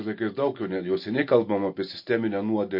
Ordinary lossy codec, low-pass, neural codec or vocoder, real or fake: MP3, 32 kbps; 5.4 kHz; none; real